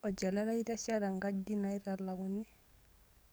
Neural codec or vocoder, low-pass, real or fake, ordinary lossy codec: codec, 44.1 kHz, 7.8 kbps, DAC; none; fake; none